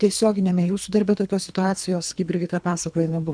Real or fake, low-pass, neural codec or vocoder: fake; 9.9 kHz; codec, 24 kHz, 3 kbps, HILCodec